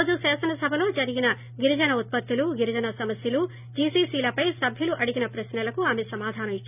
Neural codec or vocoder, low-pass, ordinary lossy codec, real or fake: none; 3.6 kHz; none; real